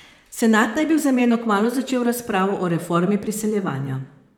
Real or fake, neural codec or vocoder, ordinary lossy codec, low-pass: fake; vocoder, 44.1 kHz, 128 mel bands, Pupu-Vocoder; none; 19.8 kHz